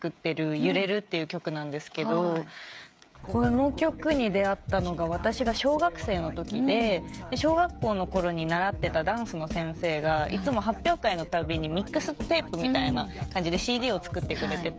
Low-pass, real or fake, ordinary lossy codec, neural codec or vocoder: none; fake; none; codec, 16 kHz, 16 kbps, FreqCodec, smaller model